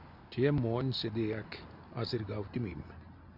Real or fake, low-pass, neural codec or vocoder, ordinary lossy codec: real; 5.4 kHz; none; MP3, 48 kbps